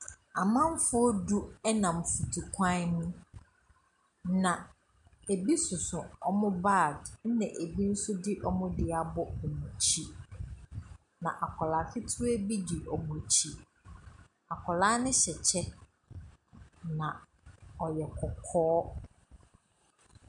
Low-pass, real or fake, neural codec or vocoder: 10.8 kHz; real; none